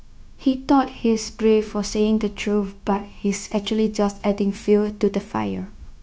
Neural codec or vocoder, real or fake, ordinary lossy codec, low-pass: codec, 16 kHz, 0.9 kbps, LongCat-Audio-Codec; fake; none; none